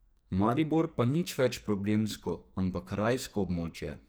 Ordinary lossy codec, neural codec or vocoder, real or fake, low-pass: none; codec, 44.1 kHz, 2.6 kbps, SNAC; fake; none